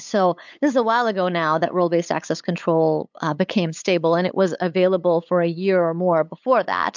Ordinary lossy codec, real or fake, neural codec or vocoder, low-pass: MP3, 64 kbps; fake; codec, 16 kHz, 8 kbps, FunCodec, trained on LibriTTS, 25 frames a second; 7.2 kHz